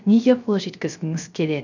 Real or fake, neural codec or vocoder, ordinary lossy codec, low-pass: fake; codec, 16 kHz, 0.3 kbps, FocalCodec; none; 7.2 kHz